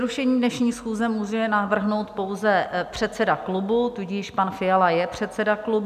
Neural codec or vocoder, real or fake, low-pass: none; real; 14.4 kHz